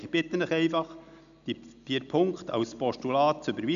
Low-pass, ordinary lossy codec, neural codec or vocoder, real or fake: 7.2 kHz; none; none; real